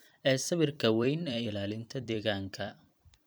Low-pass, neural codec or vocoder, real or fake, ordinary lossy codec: none; vocoder, 44.1 kHz, 128 mel bands every 256 samples, BigVGAN v2; fake; none